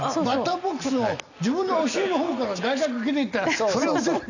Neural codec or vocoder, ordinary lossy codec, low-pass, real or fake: none; none; 7.2 kHz; real